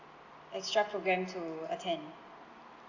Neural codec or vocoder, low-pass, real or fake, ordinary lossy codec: none; 7.2 kHz; real; Opus, 64 kbps